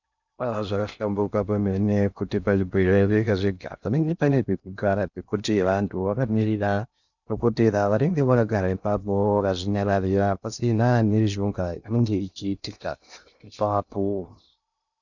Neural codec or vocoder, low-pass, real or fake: codec, 16 kHz in and 24 kHz out, 0.6 kbps, FocalCodec, streaming, 2048 codes; 7.2 kHz; fake